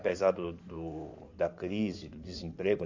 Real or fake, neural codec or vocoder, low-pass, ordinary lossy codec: fake; codec, 16 kHz in and 24 kHz out, 2.2 kbps, FireRedTTS-2 codec; 7.2 kHz; AAC, 48 kbps